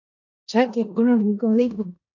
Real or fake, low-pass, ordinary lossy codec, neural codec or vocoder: fake; 7.2 kHz; AAC, 48 kbps; codec, 16 kHz in and 24 kHz out, 0.9 kbps, LongCat-Audio-Codec, four codebook decoder